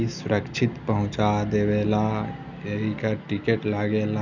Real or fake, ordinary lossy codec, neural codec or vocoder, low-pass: real; none; none; 7.2 kHz